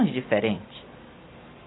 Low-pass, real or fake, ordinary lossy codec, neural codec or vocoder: 7.2 kHz; real; AAC, 16 kbps; none